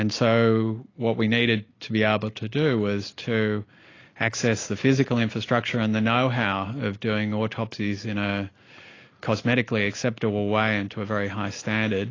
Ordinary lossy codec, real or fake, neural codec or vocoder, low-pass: AAC, 32 kbps; real; none; 7.2 kHz